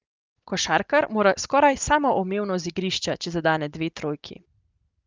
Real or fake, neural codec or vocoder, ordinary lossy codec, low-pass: real; none; Opus, 24 kbps; 7.2 kHz